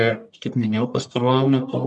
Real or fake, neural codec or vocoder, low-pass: fake; codec, 44.1 kHz, 1.7 kbps, Pupu-Codec; 10.8 kHz